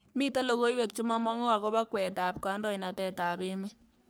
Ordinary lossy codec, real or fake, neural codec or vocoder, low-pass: none; fake; codec, 44.1 kHz, 3.4 kbps, Pupu-Codec; none